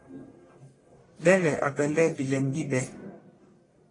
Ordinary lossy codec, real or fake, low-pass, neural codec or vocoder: AAC, 32 kbps; fake; 10.8 kHz; codec, 44.1 kHz, 1.7 kbps, Pupu-Codec